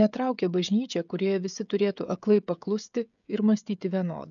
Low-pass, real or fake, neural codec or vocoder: 7.2 kHz; fake; codec, 16 kHz, 16 kbps, FreqCodec, smaller model